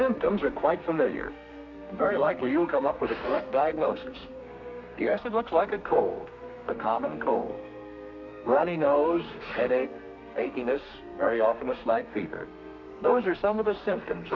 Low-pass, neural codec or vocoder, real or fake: 7.2 kHz; codec, 32 kHz, 1.9 kbps, SNAC; fake